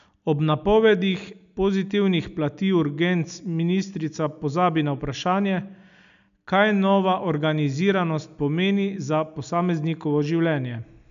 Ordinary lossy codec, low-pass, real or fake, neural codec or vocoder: none; 7.2 kHz; real; none